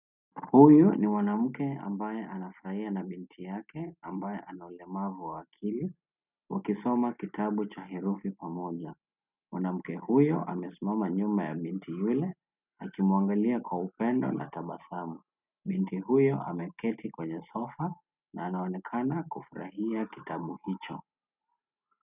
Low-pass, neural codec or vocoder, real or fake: 3.6 kHz; none; real